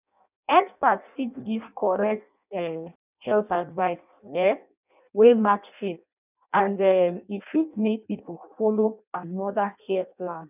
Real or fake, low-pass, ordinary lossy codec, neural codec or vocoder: fake; 3.6 kHz; none; codec, 16 kHz in and 24 kHz out, 0.6 kbps, FireRedTTS-2 codec